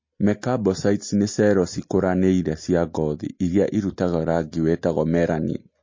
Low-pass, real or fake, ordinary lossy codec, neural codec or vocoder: 7.2 kHz; real; MP3, 32 kbps; none